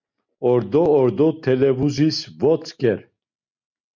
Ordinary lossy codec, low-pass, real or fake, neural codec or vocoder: MP3, 64 kbps; 7.2 kHz; real; none